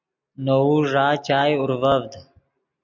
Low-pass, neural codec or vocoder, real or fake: 7.2 kHz; none; real